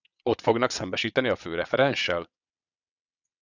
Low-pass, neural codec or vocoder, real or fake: 7.2 kHz; vocoder, 22.05 kHz, 80 mel bands, WaveNeXt; fake